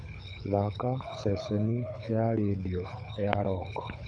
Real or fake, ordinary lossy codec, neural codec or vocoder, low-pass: fake; none; codec, 24 kHz, 6 kbps, HILCodec; 9.9 kHz